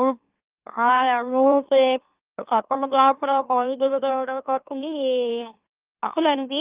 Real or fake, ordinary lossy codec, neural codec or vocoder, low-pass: fake; Opus, 24 kbps; autoencoder, 44.1 kHz, a latent of 192 numbers a frame, MeloTTS; 3.6 kHz